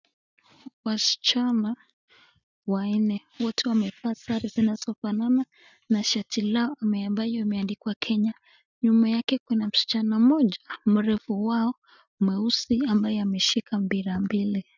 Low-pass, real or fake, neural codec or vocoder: 7.2 kHz; real; none